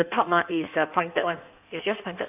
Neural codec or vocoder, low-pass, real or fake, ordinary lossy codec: codec, 16 kHz in and 24 kHz out, 1.1 kbps, FireRedTTS-2 codec; 3.6 kHz; fake; none